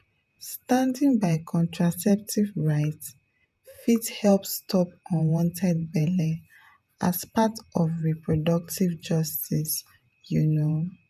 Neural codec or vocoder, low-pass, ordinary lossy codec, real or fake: vocoder, 48 kHz, 128 mel bands, Vocos; 14.4 kHz; none; fake